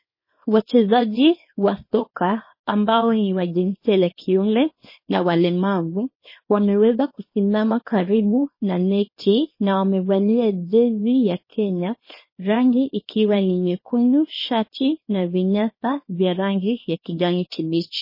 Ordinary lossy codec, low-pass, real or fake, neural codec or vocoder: MP3, 24 kbps; 5.4 kHz; fake; codec, 24 kHz, 0.9 kbps, WavTokenizer, small release